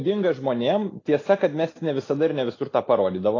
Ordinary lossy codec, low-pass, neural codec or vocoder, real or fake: AAC, 32 kbps; 7.2 kHz; none; real